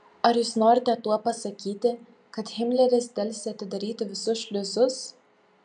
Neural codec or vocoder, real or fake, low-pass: none; real; 9.9 kHz